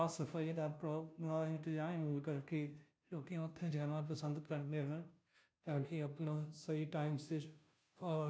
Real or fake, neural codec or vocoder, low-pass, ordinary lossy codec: fake; codec, 16 kHz, 0.5 kbps, FunCodec, trained on Chinese and English, 25 frames a second; none; none